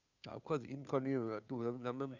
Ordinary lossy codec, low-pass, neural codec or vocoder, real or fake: none; 7.2 kHz; codec, 16 kHz, 2 kbps, FunCodec, trained on Chinese and English, 25 frames a second; fake